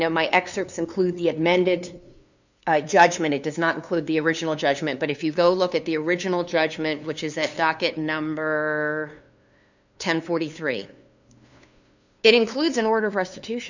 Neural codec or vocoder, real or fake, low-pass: codec, 16 kHz, 2 kbps, FunCodec, trained on LibriTTS, 25 frames a second; fake; 7.2 kHz